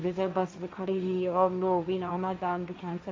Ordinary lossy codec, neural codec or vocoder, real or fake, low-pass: none; codec, 16 kHz, 1.1 kbps, Voila-Tokenizer; fake; none